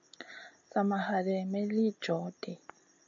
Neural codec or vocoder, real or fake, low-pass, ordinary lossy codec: none; real; 7.2 kHz; MP3, 64 kbps